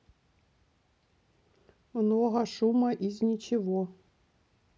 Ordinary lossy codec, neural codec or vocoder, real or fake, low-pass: none; none; real; none